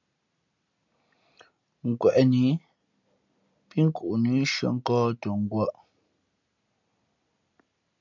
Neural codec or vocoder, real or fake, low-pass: none; real; 7.2 kHz